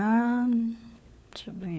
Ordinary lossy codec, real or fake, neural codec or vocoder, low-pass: none; fake; codec, 16 kHz, 4 kbps, FunCodec, trained on LibriTTS, 50 frames a second; none